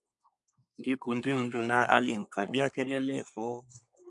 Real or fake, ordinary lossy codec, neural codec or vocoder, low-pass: fake; MP3, 96 kbps; codec, 24 kHz, 1 kbps, SNAC; 10.8 kHz